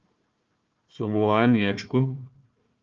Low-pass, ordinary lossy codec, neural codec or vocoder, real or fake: 7.2 kHz; Opus, 24 kbps; codec, 16 kHz, 1 kbps, FunCodec, trained on Chinese and English, 50 frames a second; fake